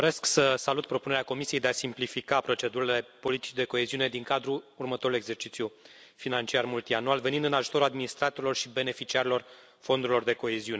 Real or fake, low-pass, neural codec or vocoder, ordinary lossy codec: real; none; none; none